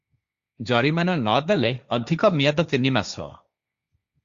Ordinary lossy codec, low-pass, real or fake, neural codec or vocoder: Opus, 64 kbps; 7.2 kHz; fake; codec, 16 kHz, 1.1 kbps, Voila-Tokenizer